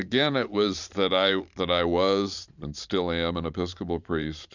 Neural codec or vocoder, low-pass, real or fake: vocoder, 44.1 kHz, 128 mel bands every 512 samples, BigVGAN v2; 7.2 kHz; fake